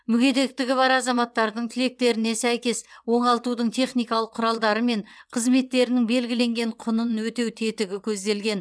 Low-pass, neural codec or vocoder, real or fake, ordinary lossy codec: none; vocoder, 22.05 kHz, 80 mel bands, WaveNeXt; fake; none